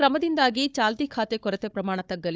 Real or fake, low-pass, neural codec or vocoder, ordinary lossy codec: fake; none; codec, 16 kHz, 4 kbps, FunCodec, trained on Chinese and English, 50 frames a second; none